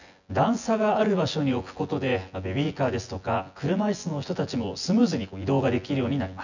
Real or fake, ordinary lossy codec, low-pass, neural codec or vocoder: fake; none; 7.2 kHz; vocoder, 24 kHz, 100 mel bands, Vocos